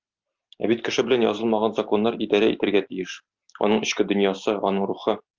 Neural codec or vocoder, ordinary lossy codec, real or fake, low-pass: none; Opus, 24 kbps; real; 7.2 kHz